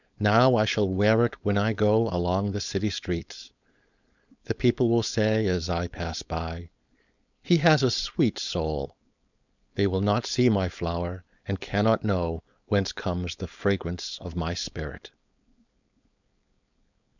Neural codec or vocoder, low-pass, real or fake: codec, 16 kHz, 4.8 kbps, FACodec; 7.2 kHz; fake